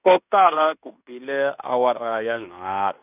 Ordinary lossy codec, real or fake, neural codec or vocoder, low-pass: none; fake; codec, 16 kHz in and 24 kHz out, 0.9 kbps, LongCat-Audio-Codec, fine tuned four codebook decoder; 3.6 kHz